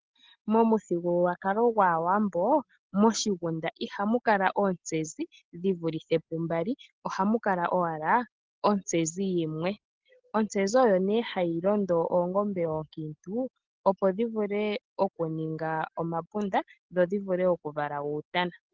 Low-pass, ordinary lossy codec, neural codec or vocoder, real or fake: 7.2 kHz; Opus, 16 kbps; none; real